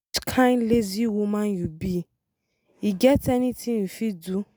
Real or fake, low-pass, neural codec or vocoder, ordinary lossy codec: real; none; none; none